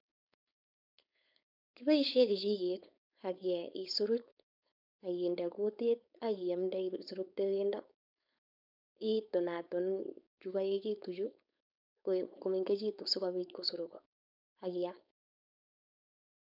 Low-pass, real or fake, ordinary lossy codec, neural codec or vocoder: 5.4 kHz; fake; none; codec, 16 kHz, 4.8 kbps, FACodec